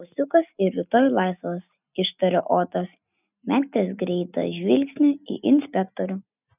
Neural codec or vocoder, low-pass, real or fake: none; 3.6 kHz; real